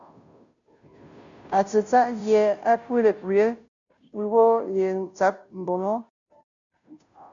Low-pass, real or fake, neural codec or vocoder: 7.2 kHz; fake; codec, 16 kHz, 0.5 kbps, FunCodec, trained on Chinese and English, 25 frames a second